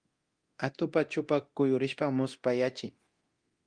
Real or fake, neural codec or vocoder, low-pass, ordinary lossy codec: fake; codec, 24 kHz, 0.9 kbps, DualCodec; 9.9 kHz; Opus, 24 kbps